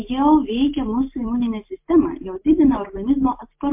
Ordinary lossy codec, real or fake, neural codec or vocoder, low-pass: MP3, 32 kbps; real; none; 3.6 kHz